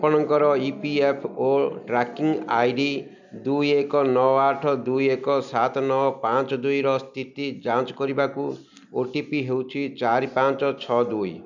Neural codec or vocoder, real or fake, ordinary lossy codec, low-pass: none; real; none; 7.2 kHz